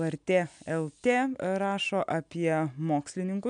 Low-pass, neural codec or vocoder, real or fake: 9.9 kHz; none; real